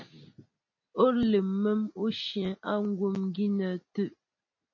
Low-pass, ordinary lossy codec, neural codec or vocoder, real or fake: 7.2 kHz; MP3, 32 kbps; none; real